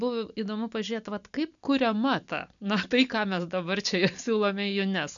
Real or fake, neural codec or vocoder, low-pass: real; none; 7.2 kHz